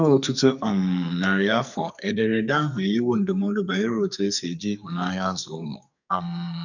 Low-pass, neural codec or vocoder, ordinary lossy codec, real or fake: 7.2 kHz; codec, 44.1 kHz, 2.6 kbps, SNAC; none; fake